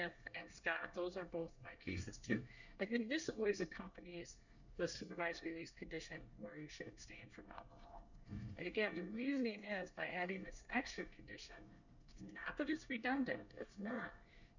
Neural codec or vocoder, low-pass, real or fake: codec, 24 kHz, 1 kbps, SNAC; 7.2 kHz; fake